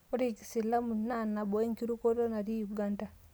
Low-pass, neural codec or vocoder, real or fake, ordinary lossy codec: none; none; real; none